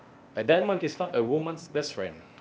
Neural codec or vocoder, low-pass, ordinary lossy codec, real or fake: codec, 16 kHz, 0.8 kbps, ZipCodec; none; none; fake